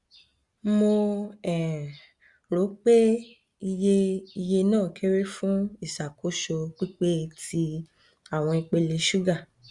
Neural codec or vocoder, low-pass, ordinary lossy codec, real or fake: none; 10.8 kHz; Opus, 64 kbps; real